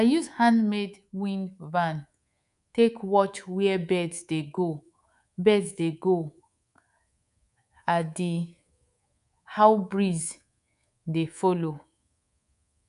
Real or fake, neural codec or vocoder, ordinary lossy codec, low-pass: fake; codec, 24 kHz, 3.1 kbps, DualCodec; none; 10.8 kHz